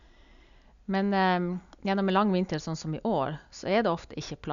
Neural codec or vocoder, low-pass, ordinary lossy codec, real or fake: none; 7.2 kHz; none; real